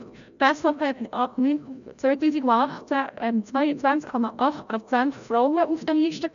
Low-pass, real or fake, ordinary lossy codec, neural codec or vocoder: 7.2 kHz; fake; none; codec, 16 kHz, 0.5 kbps, FreqCodec, larger model